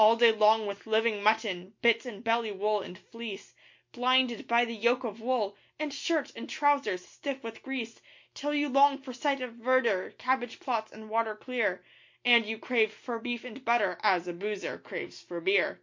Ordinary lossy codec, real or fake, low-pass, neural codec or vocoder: MP3, 48 kbps; real; 7.2 kHz; none